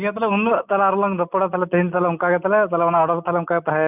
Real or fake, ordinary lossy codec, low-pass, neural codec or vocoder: real; none; 3.6 kHz; none